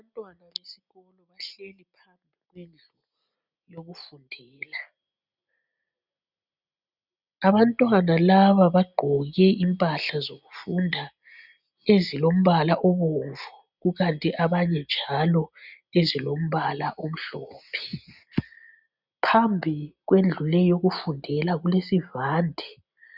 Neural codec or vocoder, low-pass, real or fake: none; 5.4 kHz; real